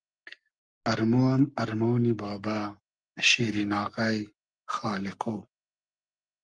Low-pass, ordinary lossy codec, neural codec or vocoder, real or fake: 9.9 kHz; Opus, 32 kbps; codec, 44.1 kHz, 7.8 kbps, Pupu-Codec; fake